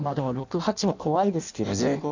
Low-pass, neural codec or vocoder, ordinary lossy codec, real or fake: 7.2 kHz; codec, 16 kHz in and 24 kHz out, 0.6 kbps, FireRedTTS-2 codec; none; fake